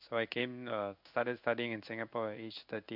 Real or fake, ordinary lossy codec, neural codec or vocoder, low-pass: fake; none; codec, 16 kHz in and 24 kHz out, 1 kbps, XY-Tokenizer; 5.4 kHz